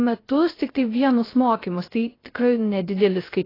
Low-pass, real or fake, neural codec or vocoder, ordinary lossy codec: 5.4 kHz; fake; codec, 16 kHz, 0.3 kbps, FocalCodec; AAC, 24 kbps